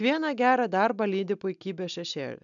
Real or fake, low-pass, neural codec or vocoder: real; 7.2 kHz; none